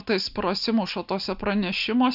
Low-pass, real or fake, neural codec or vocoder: 5.4 kHz; real; none